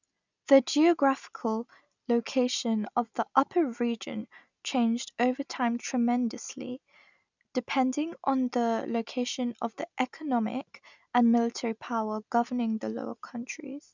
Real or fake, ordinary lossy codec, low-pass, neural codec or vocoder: real; none; 7.2 kHz; none